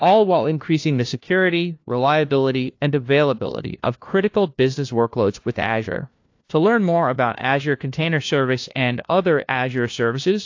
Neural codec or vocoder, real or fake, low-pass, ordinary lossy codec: codec, 16 kHz, 1 kbps, FunCodec, trained on LibriTTS, 50 frames a second; fake; 7.2 kHz; AAC, 48 kbps